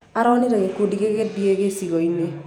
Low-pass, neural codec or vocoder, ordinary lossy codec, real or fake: 19.8 kHz; vocoder, 48 kHz, 128 mel bands, Vocos; none; fake